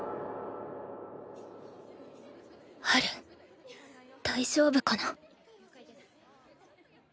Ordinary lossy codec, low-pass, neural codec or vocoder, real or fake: none; none; none; real